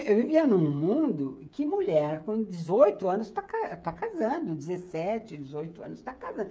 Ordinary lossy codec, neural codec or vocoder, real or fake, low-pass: none; codec, 16 kHz, 8 kbps, FreqCodec, smaller model; fake; none